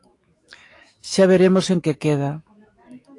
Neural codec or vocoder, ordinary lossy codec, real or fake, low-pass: autoencoder, 48 kHz, 128 numbers a frame, DAC-VAE, trained on Japanese speech; AAC, 48 kbps; fake; 10.8 kHz